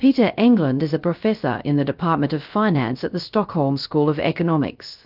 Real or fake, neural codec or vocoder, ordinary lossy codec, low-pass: fake; codec, 16 kHz, 0.3 kbps, FocalCodec; Opus, 32 kbps; 5.4 kHz